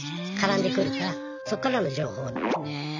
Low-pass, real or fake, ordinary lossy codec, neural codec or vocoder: 7.2 kHz; real; none; none